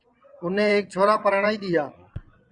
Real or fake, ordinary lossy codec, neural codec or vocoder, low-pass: fake; Opus, 64 kbps; vocoder, 22.05 kHz, 80 mel bands, Vocos; 9.9 kHz